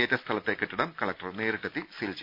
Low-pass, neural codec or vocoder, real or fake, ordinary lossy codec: 5.4 kHz; none; real; none